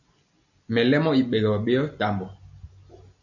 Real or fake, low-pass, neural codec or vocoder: real; 7.2 kHz; none